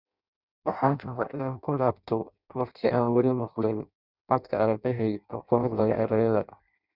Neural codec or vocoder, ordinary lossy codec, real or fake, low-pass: codec, 16 kHz in and 24 kHz out, 0.6 kbps, FireRedTTS-2 codec; none; fake; 5.4 kHz